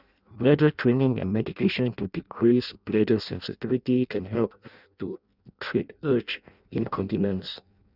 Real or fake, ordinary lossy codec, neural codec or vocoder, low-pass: fake; none; codec, 16 kHz in and 24 kHz out, 0.6 kbps, FireRedTTS-2 codec; 5.4 kHz